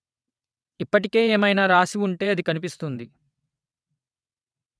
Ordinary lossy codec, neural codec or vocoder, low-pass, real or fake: none; vocoder, 22.05 kHz, 80 mel bands, WaveNeXt; none; fake